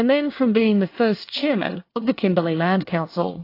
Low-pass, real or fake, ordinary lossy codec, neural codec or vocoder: 5.4 kHz; fake; AAC, 32 kbps; codec, 24 kHz, 1 kbps, SNAC